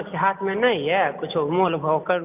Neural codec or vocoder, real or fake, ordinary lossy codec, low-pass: none; real; none; 3.6 kHz